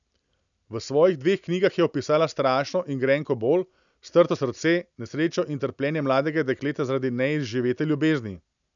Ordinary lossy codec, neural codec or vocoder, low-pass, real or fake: none; none; 7.2 kHz; real